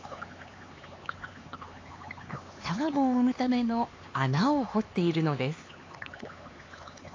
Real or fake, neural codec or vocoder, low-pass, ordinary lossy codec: fake; codec, 16 kHz, 4 kbps, X-Codec, HuBERT features, trained on LibriSpeech; 7.2 kHz; AAC, 32 kbps